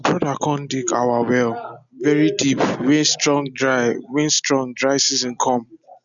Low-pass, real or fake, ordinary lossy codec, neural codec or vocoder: 7.2 kHz; real; none; none